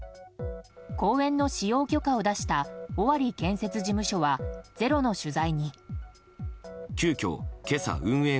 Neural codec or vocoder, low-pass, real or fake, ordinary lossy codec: none; none; real; none